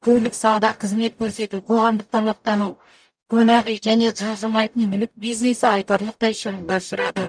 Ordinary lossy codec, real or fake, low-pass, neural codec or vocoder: none; fake; 9.9 kHz; codec, 44.1 kHz, 0.9 kbps, DAC